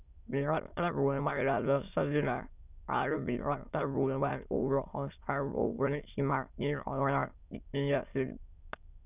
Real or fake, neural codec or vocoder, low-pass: fake; autoencoder, 22.05 kHz, a latent of 192 numbers a frame, VITS, trained on many speakers; 3.6 kHz